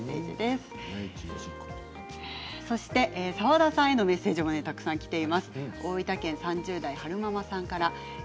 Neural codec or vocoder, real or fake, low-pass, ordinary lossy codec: none; real; none; none